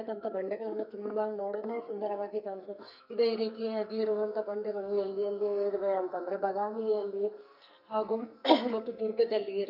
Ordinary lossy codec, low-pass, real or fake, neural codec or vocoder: none; 5.4 kHz; fake; codec, 44.1 kHz, 2.6 kbps, SNAC